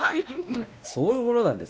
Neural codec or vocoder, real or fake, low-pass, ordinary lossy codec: codec, 16 kHz, 1 kbps, X-Codec, WavLM features, trained on Multilingual LibriSpeech; fake; none; none